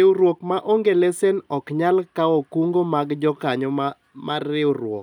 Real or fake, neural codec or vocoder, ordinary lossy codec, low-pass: real; none; none; 19.8 kHz